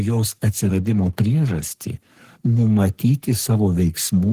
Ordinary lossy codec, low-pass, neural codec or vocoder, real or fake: Opus, 16 kbps; 14.4 kHz; codec, 44.1 kHz, 3.4 kbps, Pupu-Codec; fake